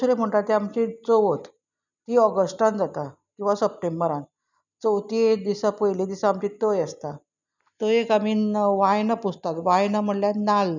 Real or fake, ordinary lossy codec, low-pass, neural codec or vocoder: real; none; 7.2 kHz; none